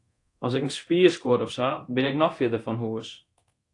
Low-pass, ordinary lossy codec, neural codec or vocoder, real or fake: 10.8 kHz; AAC, 48 kbps; codec, 24 kHz, 0.9 kbps, DualCodec; fake